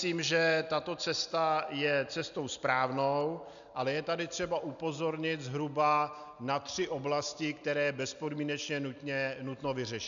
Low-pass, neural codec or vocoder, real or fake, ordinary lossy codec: 7.2 kHz; none; real; MP3, 96 kbps